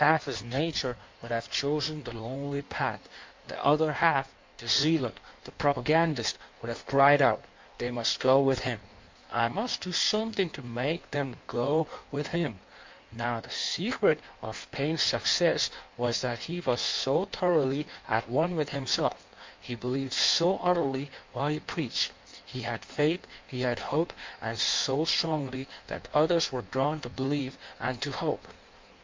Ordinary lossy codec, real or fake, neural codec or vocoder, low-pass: MP3, 48 kbps; fake; codec, 16 kHz in and 24 kHz out, 1.1 kbps, FireRedTTS-2 codec; 7.2 kHz